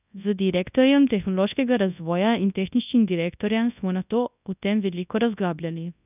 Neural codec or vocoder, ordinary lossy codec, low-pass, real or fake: codec, 24 kHz, 0.9 kbps, WavTokenizer, large speech release; none; 3.6 kHz; fake